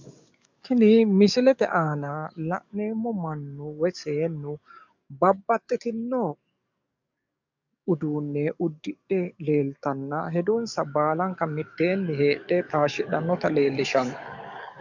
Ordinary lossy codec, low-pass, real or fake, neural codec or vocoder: MP3, 64 kbps; 7.2 kHz; fake; codec, 44.1 kHz, 7.8 kbps, DAC